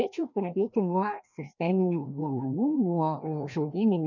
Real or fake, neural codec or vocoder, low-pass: fake; codec, 16 kHz, 1 kbps, FreqCodec, larger model; 7.2 kHz